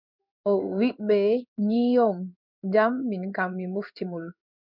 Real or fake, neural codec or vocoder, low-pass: fake; codec, 16 kHz in and 24 kHz out, 1 kbps, XY-Tokenizer; 5.4 kHz